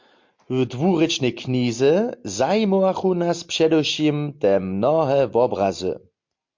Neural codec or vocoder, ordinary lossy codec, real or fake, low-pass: none; MP3, 48 kbps; real; 7.2 kHz